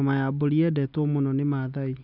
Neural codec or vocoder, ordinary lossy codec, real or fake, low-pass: none; none; real; 5.4 kHz